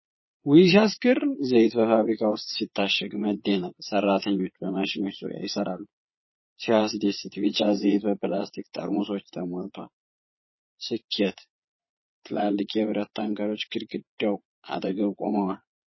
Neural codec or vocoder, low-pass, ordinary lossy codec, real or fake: vocoder, 22.05 kHz, 80 mel bands, Vocos; 7.2 kHz; MP3, 24 kbps; fake